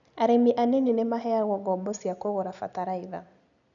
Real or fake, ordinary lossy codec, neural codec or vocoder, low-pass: real; none; none; 7.2 kHz